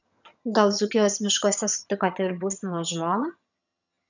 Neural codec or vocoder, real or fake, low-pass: vocoder, 22.05 kHz, 80 mel bands, HiFi-GAN; fake; 7.2 kHz